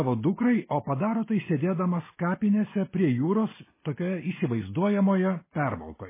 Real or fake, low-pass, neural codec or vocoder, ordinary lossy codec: real; 3.6 kHz; none; MP3, 16 kbps